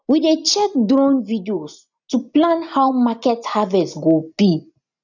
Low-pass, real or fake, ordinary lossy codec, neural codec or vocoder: 7.2 kHz; real; none; none